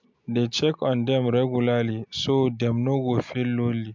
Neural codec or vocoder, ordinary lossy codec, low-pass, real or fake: none; MP3, 64 kbps; 7.2 kHz; real